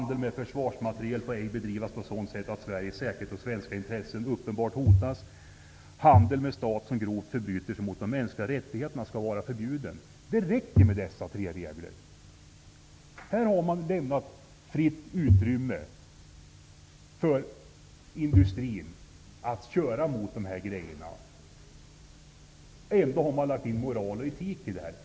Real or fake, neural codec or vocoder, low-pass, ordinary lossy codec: real; none; none; none